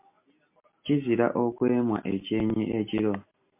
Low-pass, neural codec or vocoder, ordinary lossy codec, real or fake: 3.6 kHz; none; MP3, 32 kbps; real